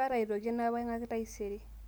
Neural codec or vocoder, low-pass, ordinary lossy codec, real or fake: none; none; none; real